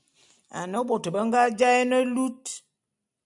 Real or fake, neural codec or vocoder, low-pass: real; none; 10.8 kHz